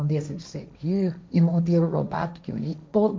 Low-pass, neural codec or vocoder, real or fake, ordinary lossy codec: none; codec, 16 kHz, 1.1 kbps, Voila-Tokenizer; fake; none